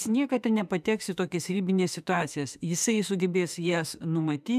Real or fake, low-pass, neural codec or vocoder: fake; 14.4 kHz; autoencoder, 48 kHz, 32 numbers a frame, DAC-VAE, trained on Japanese speech